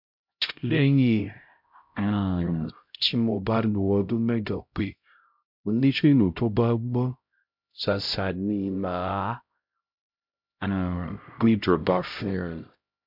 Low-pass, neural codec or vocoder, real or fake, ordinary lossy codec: 5.4 kHz; codec, 16 kHz, 0.5 kbps, X-Codec, HuBERT features, trained on LibriSpeech; fake; MP3, 48 kbps